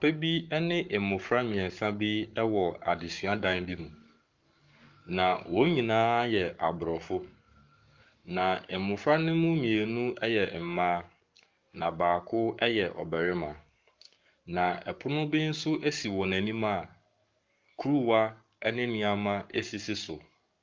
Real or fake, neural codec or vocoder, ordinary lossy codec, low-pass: fake; codec, 44.1 kHz, 7.8 kbps, Pupu-Codec; Opus, 24 kbps; 7.2 kHz